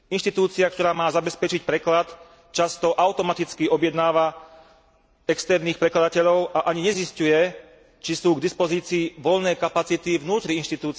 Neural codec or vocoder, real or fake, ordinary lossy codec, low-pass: none; real; none; none